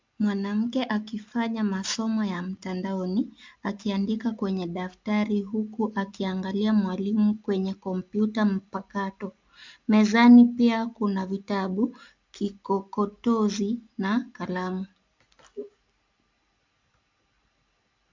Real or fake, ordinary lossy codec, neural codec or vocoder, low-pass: real; MP3, 64 kbps; none; 7.2 kHz